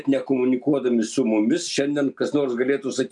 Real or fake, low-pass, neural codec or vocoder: real; 10.8 kHz; none